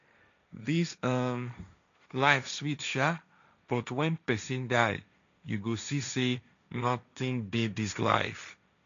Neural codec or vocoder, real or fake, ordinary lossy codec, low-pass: codec, 16 kHz, 1.1 kbps, Voila-Tokenizer; fake; MP3, 96 kbps; 7.2 kHz